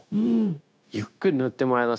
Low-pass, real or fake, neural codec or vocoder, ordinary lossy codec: none; fake; codec, 16 kHz, 0.9 kbps, LongCat-Audio-Codec; none